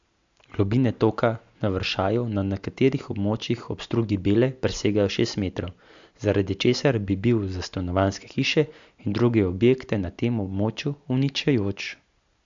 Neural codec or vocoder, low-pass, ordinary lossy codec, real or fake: none; 7.2 kHz; MP3, 64 kbps; real